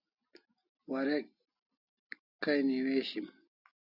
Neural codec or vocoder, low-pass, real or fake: none; 5.4 kHz; real